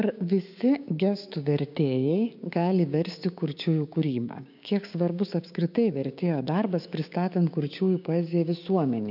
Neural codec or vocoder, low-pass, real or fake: codec, 16 kHz, 6 kbps, DAC; 5.4 kHz; fake